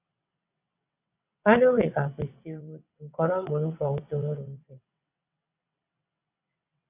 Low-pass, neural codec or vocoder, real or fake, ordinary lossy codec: 3.6 kHz; vocoder, 22.05 kHz, 80 mel bands, WaveNeXt; fake; AAC, 32 kbps